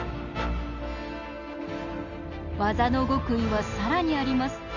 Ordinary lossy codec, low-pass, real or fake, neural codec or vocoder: none; 7.2 kHz; real; none